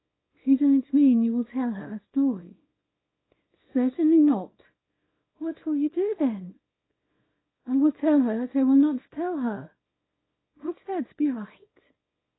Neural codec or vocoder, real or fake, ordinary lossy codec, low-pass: codec, 24 kHz, 0.9 kbps, WavTokenizer, small release; fake; AAC, 16 kbps; 7.2 kHz